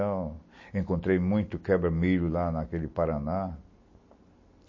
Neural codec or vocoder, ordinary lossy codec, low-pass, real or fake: none; MP3, 32 kbps; 7.2 kHz; real